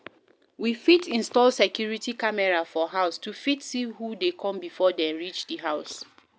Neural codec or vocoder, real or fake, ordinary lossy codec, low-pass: none; real; none; none